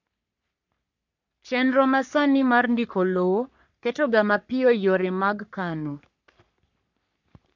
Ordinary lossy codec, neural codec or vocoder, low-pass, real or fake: none; codec, 44.1 kHz, 3.4 kbps, Pupu-Codec; 7.2 kHz; fake